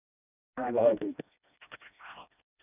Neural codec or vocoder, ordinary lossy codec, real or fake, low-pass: codec, 16 kHz, 1 kbps, FreqCodec, smaller model; none; fake; 3.6 kHz